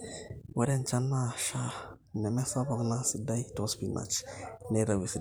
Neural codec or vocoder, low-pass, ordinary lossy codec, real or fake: vocoder, 44.1 kHz, 128 mel bands every 512 samples, BigVGAN v2; none; none; fake